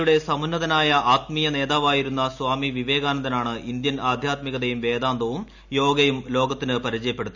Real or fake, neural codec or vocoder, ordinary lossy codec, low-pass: real; none; none; 7.2 kHz